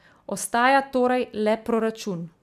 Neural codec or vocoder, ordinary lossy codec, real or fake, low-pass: none; none; real; 14.4 kHz